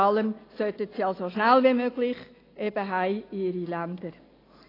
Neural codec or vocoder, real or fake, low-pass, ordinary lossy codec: none; real; 5.4 kHz; AAC, 24 kbps